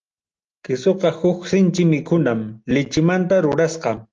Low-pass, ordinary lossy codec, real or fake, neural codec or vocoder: 7.2 kHz; Opus, 24 kbps; real; none